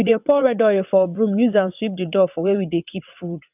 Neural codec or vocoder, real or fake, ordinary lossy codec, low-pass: vocoder, 22.05 kHz, 80 mel bands, WaveNeXt; fake; none; 3.6 kHz